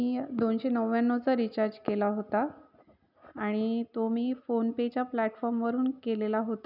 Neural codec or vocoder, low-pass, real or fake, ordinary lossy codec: none; 5.4 kHz; real; none